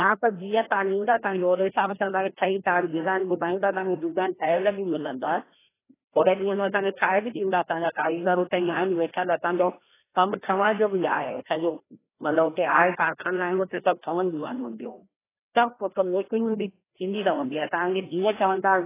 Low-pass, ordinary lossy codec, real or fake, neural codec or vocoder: 3.6 kHz; AAC, 16 kbps; fake; codec, 16 kHz, 1 kbps, FreqCodec, larger model